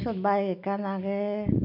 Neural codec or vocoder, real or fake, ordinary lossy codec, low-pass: none; real; MP3, 48 kbps; 5.4 kHz